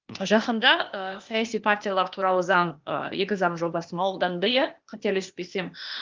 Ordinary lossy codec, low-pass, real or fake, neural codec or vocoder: Opus, 32 kbps; 7.2 kHz; fake; codec, 16 kHz, 0.8 kbps, ZipCodec